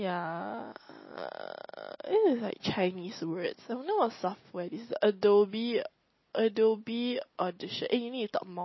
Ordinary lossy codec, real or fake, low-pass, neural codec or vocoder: MP3, 24 kbps; real; 7.2 kHz; none